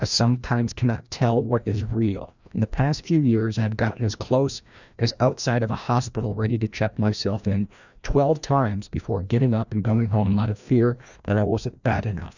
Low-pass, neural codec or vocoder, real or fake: 7.2 kHz; codec, 16 kHz, 1 kbps, FreqCodec, larger model; fake